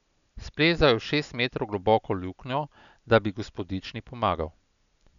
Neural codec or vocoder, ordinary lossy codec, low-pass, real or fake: none; none; 7.2 kHz; real